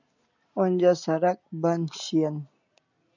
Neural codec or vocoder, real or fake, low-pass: none; real; 7.2 kHz